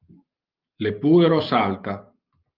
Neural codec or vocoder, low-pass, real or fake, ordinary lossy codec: none; 5.4 kHz; real; Opus, 24 kbps